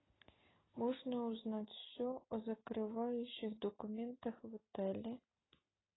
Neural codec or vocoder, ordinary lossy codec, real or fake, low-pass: none; AAC, 16 kbps; real; 7.2 kHz